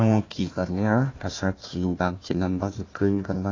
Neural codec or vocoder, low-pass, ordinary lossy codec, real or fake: codec, 16 kHz, 1 kbps, FunCodec, trained on Chinese and English, 50 frames a second; 7.2 kHz; AAC, 32 kbps; fake